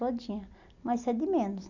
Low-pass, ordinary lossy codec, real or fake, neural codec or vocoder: 7.2 kHz; none; real; none